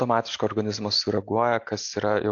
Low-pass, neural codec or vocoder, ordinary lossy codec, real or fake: 7.2 kHz; none; AAC, 48 kbps; real